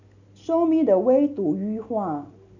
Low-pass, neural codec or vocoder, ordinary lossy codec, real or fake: 7.2 kHz; none; none; real